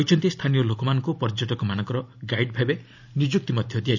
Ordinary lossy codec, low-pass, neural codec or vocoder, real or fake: none; 7.2 kHz; none; real